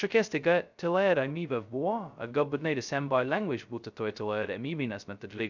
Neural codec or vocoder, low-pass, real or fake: codec, 16 kHz, 0.2 kbps, FocalCodec; 7.2 kHz; fake